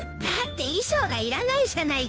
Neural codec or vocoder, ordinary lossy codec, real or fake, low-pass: codec, 16 kHz, 2 kbps, FunCodec, trained on Chinese and English, 25 frames a second; none; fake; none